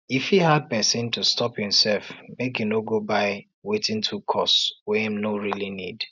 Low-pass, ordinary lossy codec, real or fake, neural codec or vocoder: 7.2 kHz; none; real; none